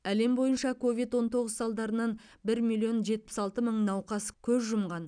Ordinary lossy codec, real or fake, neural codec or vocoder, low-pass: none; real; none; 9.9 kHz